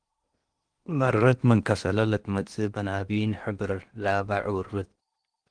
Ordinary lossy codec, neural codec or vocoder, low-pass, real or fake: Opus, 24 kbps; codec, 16 kHz in and 24 kHz out, 0.8 kbps, FocalCodec, streaming, 65536 codes; 9.9 kHz; fake